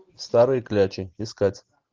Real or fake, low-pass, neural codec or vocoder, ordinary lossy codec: real; 7.2 kHz; none; Opus, 32 kbps